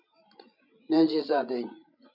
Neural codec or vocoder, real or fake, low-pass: codec, 16 kHz, 16 kbps, FreqCodec, larger model; fake; 5.4 kHz